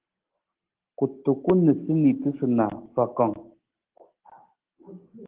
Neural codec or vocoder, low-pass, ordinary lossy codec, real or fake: none; 3.6 kHz; Opus, 16 kbps; real